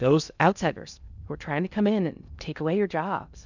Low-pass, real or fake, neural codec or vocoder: 7.2 kHz; fake; codec, 16 kHz in and 24 kHz out, 0.6 kbps, FocalCodec, streaming, 2048 codes